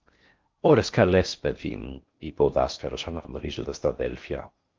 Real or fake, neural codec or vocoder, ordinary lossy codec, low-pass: fake; codec, 16 kHz in and 24 kHz out, 0.6 kbps, FocalCodec, streaming, 4096 codes; Opus, 24 kbps; 7.2 kHz